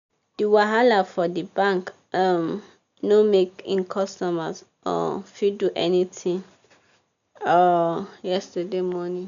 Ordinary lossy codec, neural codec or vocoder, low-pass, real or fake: none; none; 7.2 kHz; real